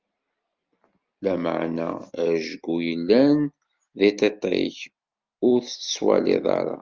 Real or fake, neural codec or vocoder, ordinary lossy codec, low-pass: real; none; Opus, 24 kbps; 7.2 kHz